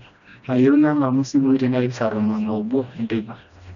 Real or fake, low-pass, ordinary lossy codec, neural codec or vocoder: fake; 7.2 kHz; none; codec, 16 kHz, 1 kbps, FreqCodec, smaller model